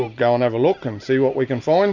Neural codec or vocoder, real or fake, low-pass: none; real; 7.2 kHz